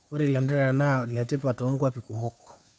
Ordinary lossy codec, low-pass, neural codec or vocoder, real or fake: none; none; codec, 16 kHz, 2 kbps, FunCodec, trained on Chinese and English, 25 frames a second; fake